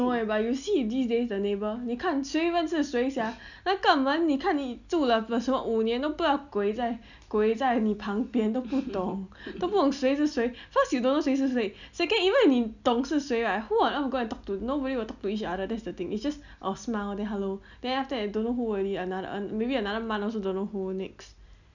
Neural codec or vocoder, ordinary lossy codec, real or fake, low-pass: none; none; real; 7.2 kHz